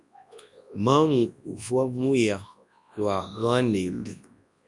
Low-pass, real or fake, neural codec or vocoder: 10.8 kHz; fake; codec, 24 kHz, 0.9 kbps, WavTokenizer, large speech release